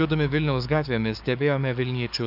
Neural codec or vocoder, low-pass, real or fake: codec, 44.1 kHz, 7.8 kbps, DAC; 5.4 kHz; fake